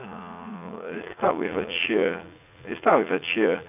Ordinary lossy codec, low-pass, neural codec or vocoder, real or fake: none; 3.6 kHz; vocoder, 44.1 kHz, 80 mel bands, Vocos; fake